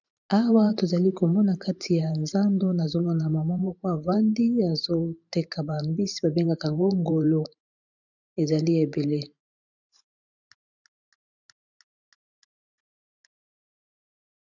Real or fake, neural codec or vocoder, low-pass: fake; vocoder, 44.1 kHz, 128 mel bands every 512 samples, BigVGAN v2; 7.2 kHz